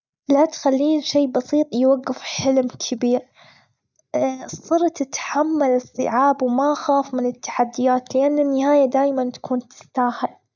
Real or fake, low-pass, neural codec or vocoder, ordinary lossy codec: real; 7.2 kHz; none; none